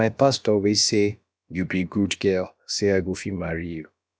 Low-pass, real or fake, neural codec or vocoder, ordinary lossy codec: none; fake; codec, 16 kHz, about 1 kbps, DyCAST, with the encoder's durations; none